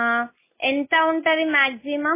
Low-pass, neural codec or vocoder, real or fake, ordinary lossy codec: 3.6 kHz; none; real; MP3, 16 kbps